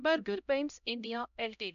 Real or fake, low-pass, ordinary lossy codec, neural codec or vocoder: fake; 7.2 kHz; none; codec, 16 kHz, 0.5 kbps, X-Codec, HuBERT features, trained on LibriSpeech